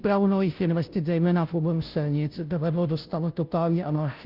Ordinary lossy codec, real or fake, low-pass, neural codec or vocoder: Opus, 32 kbps; fake; 5.4 kHz; codec, 16 kHz, 0.5 kbps, FunCodec, trained on Chinese and English, 25 frames a second